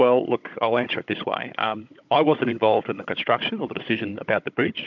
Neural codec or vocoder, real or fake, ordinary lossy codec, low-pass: codec, 16 kHz, 16 kbps, FunCodec, trained on Chinese and English, 50 frames a second; fake; MP3, 64 kbps; 7.2 kHz